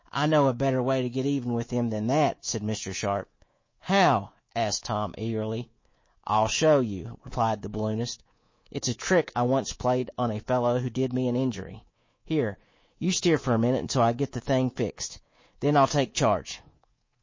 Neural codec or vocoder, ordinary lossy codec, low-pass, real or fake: codec, 24 kHz, 3.1 kbps, DualCodec; MP3, 32 kbps; 7.2 kHz; fake